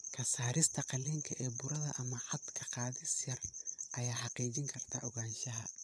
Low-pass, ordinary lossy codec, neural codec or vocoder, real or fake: none; none; vocoder, 22.05 kHz, 80 mel bands, Vocos; fake